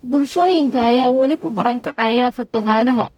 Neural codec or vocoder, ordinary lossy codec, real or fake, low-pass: codec, 44.1 kHz, 0.9 kbps, DAC; MP3, 96 kbps; fake; 19.8 kHz